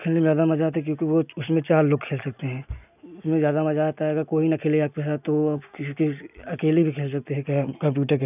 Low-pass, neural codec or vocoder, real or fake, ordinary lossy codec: 3.6 kHz; none; real; none